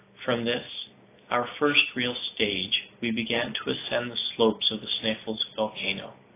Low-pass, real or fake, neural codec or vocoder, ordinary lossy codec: 3.6 kHz; real; none; AAC, 24 kbps